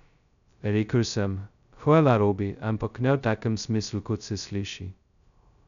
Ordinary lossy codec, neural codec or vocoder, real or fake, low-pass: none; codec, 16 kHz, 0.2 kbps, FocalCodec; fake; 7.2 kHz